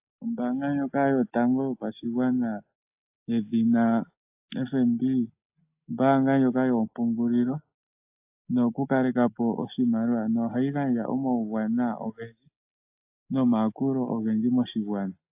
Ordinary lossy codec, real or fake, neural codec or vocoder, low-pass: AAC, 32 kbps; real; none; 3.6 kHz